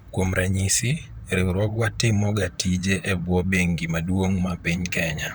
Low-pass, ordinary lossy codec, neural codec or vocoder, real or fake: none; none; vocoder, 44.1 kHz, 128 mel bands, Pupu-Vocoder; fake